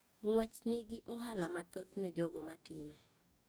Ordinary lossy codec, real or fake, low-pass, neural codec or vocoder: none; fake; none; codec, 44.1 kHz, 2.6 kbps, DAC